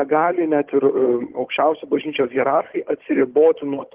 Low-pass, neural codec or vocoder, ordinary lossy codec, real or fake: 3.6 kHz; codec, 16 kHz, 16 kbps, FunCodec, trained on Chinese and English, 50 frames a second; Opus, 16 kbps; fake